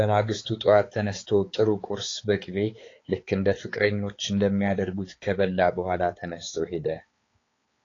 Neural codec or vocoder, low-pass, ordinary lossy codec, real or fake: codec, 16 kHz, 4 kbps, X-Codec, HuBERT features, trained on balanced general audio; 7.2 kHz; AAC, 32 kbps; fake